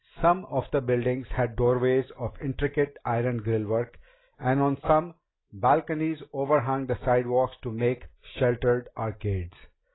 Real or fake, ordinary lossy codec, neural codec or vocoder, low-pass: real; AAC, 16 kbps; none; 7.2 kHz